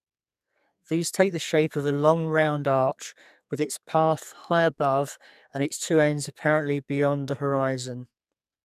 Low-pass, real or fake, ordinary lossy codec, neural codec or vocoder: 14.4 kHz; fake; none; codec, 44.1 kHz, 2.6 kbps, SNAC